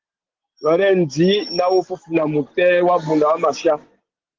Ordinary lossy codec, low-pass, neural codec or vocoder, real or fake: Opus, 16 kbps; 7.2 kHz; none; real